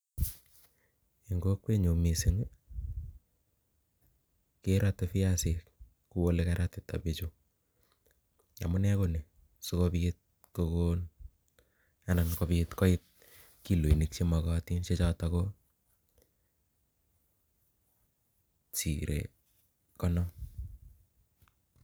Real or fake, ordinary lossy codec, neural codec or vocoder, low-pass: real; none; none; none